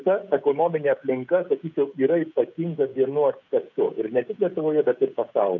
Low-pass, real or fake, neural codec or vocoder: 7.2 kHz; fake; codec, 16 kHz, 16 kbps, FreqCodec, smaller model